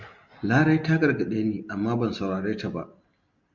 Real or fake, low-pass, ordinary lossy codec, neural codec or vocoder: real; 7.2 kHz; Opus, 64 kbps; none